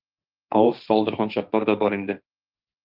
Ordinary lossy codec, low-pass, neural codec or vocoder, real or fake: Opus, 32 kbps; 5.4 kHz; codec, 16 kHz, 1.1 kbps, Voila-Tokenizer; fake